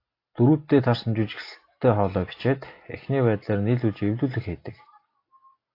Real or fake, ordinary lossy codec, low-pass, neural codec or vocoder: real; AAC, 32 kbps; 5.4 kHz; none